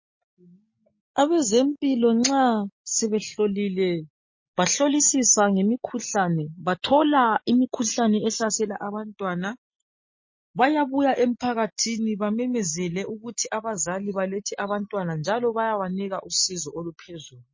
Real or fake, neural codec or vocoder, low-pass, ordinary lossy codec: real; none; 7.2 kHz; MP3, 32 kbps